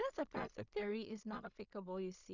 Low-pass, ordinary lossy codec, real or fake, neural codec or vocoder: 7.2 kHz; none; fake; codec, 16 kHz in and 24 kHz out, 0.4 kbps, LongCat-Audio-Codec, two codebook decoder